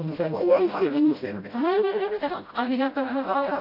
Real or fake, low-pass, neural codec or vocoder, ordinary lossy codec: fake; 5.4 kHz; codec, 16 kHz, 0.5 kbps, FreqCodec, smaller model; AAC, 32 kbps